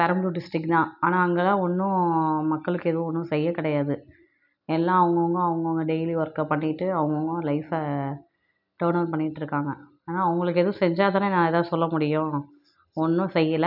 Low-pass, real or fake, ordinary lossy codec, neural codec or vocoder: 5.4 kHz; real; none; none